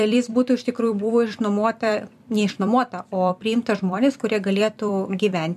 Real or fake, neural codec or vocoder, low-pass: fake; vocoder, 44.1 kHz, 128 mel bands every 256 samples, BigVGAN v2; 14.4 kHz